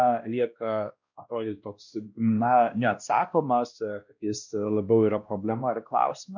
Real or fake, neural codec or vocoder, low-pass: fake; codec, 16 kHz, 1 kbps, X-Codec, WavLM features, trained on Multilingual LibriSpeech; 7.2 kHz